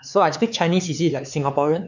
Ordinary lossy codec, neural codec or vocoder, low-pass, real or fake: none; codec, 16 kHz, 4 kbps, X-Codec, HuBERT features, trained on LibriSpeech; 7.2 kHz; fake